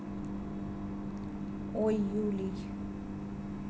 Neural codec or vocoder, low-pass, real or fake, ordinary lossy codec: none; none; real; none